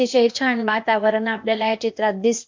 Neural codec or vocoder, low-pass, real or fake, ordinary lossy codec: codec, 16 kHz, 0.8 kbps, ZipCodec; 7.2 kHz; fake; MP3, 48 kbps